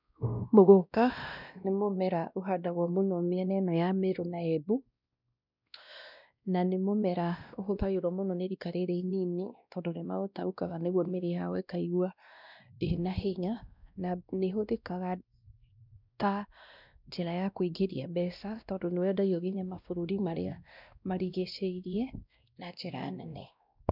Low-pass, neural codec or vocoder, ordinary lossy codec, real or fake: 5.4 kHz; codec, 16 kHz, 1 kbps, X-Codec, WavLM features, trained on Multilingual LibriSpeech; none; fake